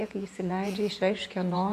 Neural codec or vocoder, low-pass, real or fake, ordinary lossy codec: vocoder, 48 kHz, 128 mel bands, Vocos; 14.4 kHz; fake; MP3, 64 kbps